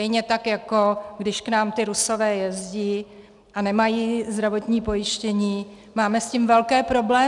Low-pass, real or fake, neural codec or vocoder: 10.8 kHz; real; none